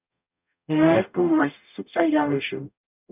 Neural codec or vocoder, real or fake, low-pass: codec, 44.1 kHz, 0.9 kbps, DAC; fake; 3.6 kHz